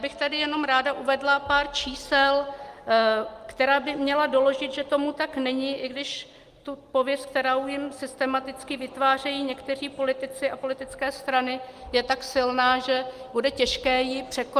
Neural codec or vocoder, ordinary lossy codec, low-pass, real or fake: none; Opus, 32 kbps; 14.4 kHz; real